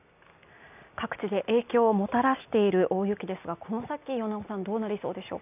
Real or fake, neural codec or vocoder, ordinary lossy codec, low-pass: real; none; none; 3.6 kHz